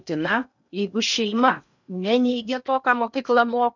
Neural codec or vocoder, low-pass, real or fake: codec, 16 kHz in and 24 kHz out, 0.8 kbps, FocalCodec, streaming, 65536 codes; 7.2 kHz; fake